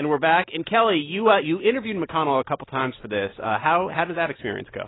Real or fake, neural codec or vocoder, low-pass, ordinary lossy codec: real; none; 7.2 kHz; AAC, 16 kbps